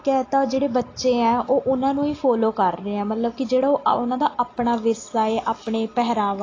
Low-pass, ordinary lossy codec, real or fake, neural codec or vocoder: 7.2 kHz; AAC, 32 kbps; real; none